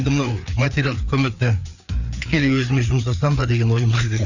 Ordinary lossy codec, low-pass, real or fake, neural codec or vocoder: none; 7.2 kHz; fake; codec, 16 kHz, 4 kbps, FreqCodec, larger model